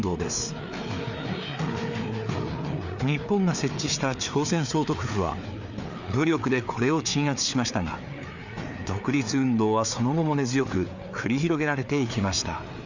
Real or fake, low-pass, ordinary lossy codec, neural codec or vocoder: fake; 7.2 kHz; none; codec, 16 kHz, 4 kbps, FreqCodec, larger model